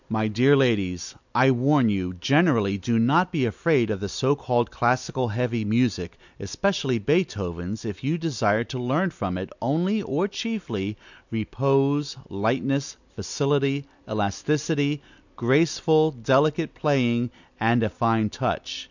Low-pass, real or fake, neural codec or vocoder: 7.2 kHz; real; none